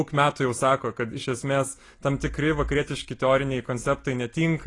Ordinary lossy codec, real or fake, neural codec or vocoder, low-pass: AAC, 32 kbps; real; none; 10.8 kHz